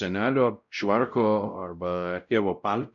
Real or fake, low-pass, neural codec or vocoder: fake; 7.2 kHz; codec, 16 kHz, 0.5 kbps, X-Codec, WavLM features, trained on Multilingual LibriSpeech